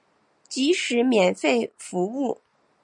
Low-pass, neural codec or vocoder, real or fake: 10.8 kHz; none; real